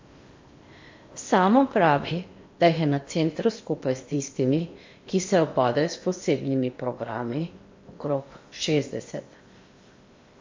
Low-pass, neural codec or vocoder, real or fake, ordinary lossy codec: 7.2 kHz; codec, 16 kHz in and 24 kHz out, 0.8 kbps, FocalCodec, streaming, 65536 codes; fake; MP3, 48 kbps